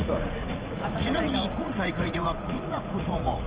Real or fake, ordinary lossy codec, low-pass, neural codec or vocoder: fake; Opus, 24 kbps; 3.6 kHz; vocoder, 44.1 kHz, 80 mel bands, Vocos